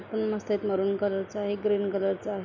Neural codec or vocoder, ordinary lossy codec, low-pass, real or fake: none; none; 7.2 kHz; real